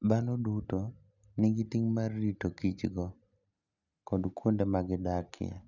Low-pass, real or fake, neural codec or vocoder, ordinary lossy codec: 7.2 kHz; real; none; none